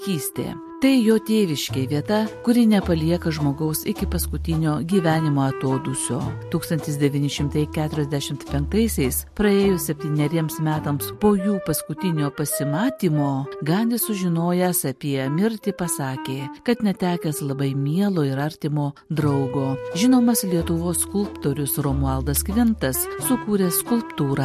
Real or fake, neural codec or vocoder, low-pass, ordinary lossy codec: real; none; 14.4 kHz; MP3, 64 kbps